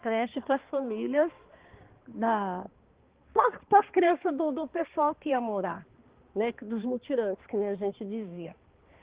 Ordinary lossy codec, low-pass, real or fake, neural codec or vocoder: Opus, 16 kbps; 3.6 kHz; fake; codec, 16 kHz, 2 kbps, X-Codec, HuBERT features, trained on balanced general audio